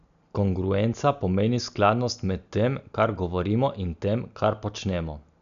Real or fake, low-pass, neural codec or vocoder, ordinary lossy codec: real; 7.2 kHz; none; none